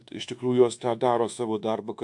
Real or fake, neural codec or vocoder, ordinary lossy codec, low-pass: fake; codec, 24 kHz, 1.2 kbps, DualCodec; AAC, 64 kbps; 10.8 kHz